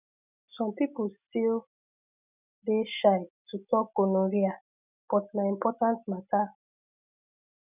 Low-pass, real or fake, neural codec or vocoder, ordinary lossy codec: 3.6 kHz; real; none; none